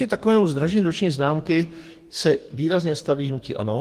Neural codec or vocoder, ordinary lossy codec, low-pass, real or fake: codec, 44.1 kHz, 2.6 kbps, DAC; Opus, 24 kbps; 14.4 kHz; fake